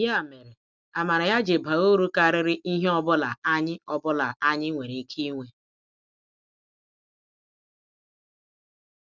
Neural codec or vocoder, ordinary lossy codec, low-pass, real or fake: none; none; none; real